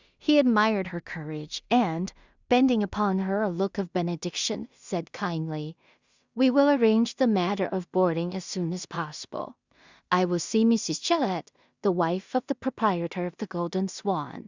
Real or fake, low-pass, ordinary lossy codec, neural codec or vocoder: fake; 7.2 kHz; Opus, 64 kbps; codec, 16 kHz in and 24 kHz out, 0.4 kbps, LongCat-Audio-Codec, two codebook decoder